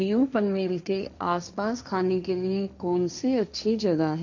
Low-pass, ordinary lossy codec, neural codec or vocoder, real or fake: 7.2 kHz; none; codec, 16 kHz, 1.1 kbps, Voila-Tokenizer; fake